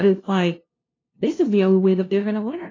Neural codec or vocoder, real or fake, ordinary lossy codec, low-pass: codec, 16 kHz, 0.5 kbps, FunCodec, trained on LibriTTS, 25 frames a second; fake; AAC, 32 kbps; 7.2 kHz